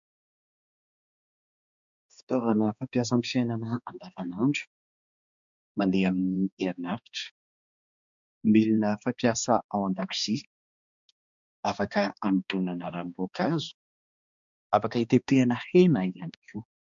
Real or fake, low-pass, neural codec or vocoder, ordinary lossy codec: fake; 7.2 kHz; codec, 16 kHz, 2 kbps, X-Codec, HuBERT features, trained on balanced general audio; MP3, 64 kbps